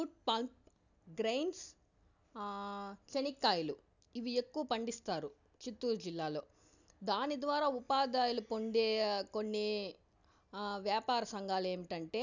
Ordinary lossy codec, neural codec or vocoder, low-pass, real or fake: none; none; 7.2 kHz; real